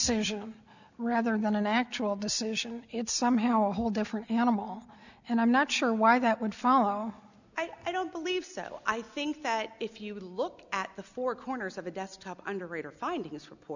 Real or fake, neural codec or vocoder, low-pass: real; none; 7.2 kHz